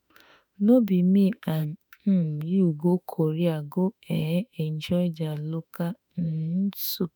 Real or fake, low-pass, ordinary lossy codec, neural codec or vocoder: fake; none; none; autoencoder, 48 kHz, 32 numbers a frame, DAC-VAE, trained on Japanese speech